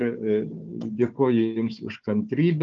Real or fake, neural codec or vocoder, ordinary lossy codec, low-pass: fake; codec, 16 kHz, 4 kbps, FunCodec, trained on Chinese and English, 50 frames a second; Opus, 24 kbps; 7.2 kHz